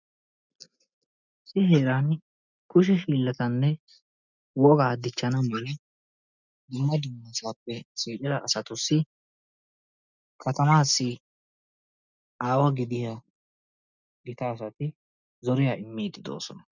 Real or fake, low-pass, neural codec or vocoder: real; 7.2 kHz; none